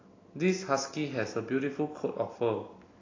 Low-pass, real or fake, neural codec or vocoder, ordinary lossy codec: 7.2 kHz; real; none; AAC, 32 kbps